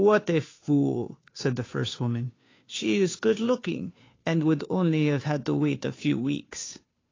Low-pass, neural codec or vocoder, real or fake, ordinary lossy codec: 7.2 kHz; codec, 16 kHz, 2 kbps, FunCodec, trained on Chinese and English, 25 frames a second; fake; AAC, 32 kbps